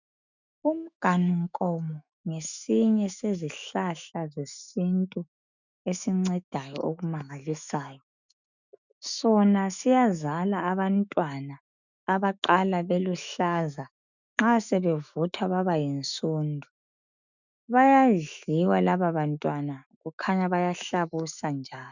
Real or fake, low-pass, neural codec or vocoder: fake; 7.2 kHz; autoencoder, 48 kHz, 128 numbers a frame, DAC-VAE, trained on Japanese speech